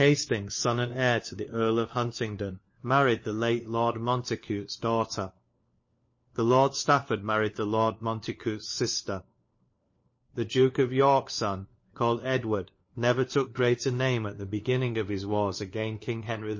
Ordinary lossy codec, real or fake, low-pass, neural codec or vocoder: MP3, 32 kbps; fake; 7.2 kHz; codec, 16 kHz, 4 kbps, FunCodec, trained on LibriTTS, 50 frames a second